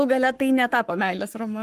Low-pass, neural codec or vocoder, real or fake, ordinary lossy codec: 14.4 kHz; codec, 44.1 kHz, 7.8 kbps, Pupu-Codec; fake; Opus, 24 kbps